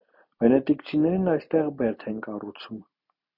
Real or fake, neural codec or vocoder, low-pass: real; none; 5.4 kHz